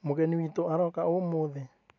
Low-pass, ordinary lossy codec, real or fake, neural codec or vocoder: 7.2 kHz; AAC, 48 kbps; real; none